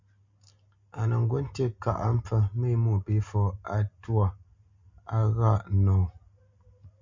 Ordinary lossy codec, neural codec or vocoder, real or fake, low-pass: MP3, 64 kbps; none; real; 7.2 kHz